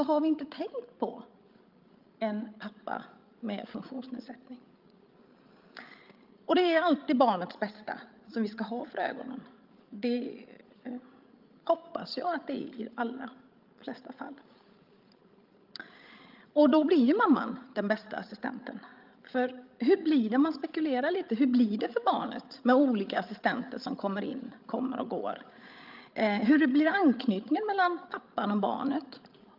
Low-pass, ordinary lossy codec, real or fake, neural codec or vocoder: 5.4 kHz; Opus, 24 kbps; fake; codec, 16 kHz, 16 kbps, FreqCodec, larger model